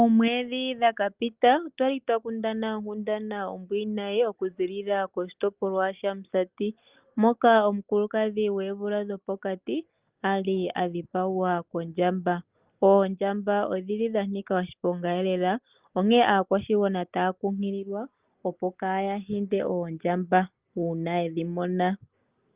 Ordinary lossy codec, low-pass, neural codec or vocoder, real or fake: Opus, 32 kbps; 3.6 kHz; none; real